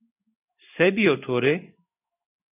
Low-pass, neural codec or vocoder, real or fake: 3.6 kHz; codec, 16 kHz in and 24 kHz out, 1 kbps, XY-Tokenizer; fake